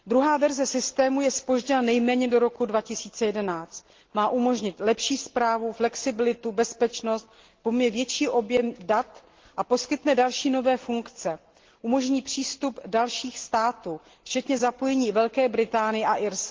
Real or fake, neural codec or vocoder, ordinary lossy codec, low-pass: real; none; Opus, 16 kbps; 7.2 kHz